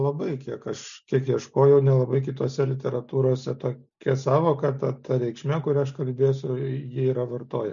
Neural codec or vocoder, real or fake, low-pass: none; real; 7.2 kHz